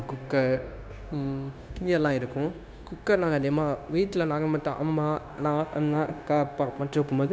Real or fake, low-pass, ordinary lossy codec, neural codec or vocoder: fake; none; none; codec, 16 kHz, 0.9 kbps, LongCat-Audio-Codec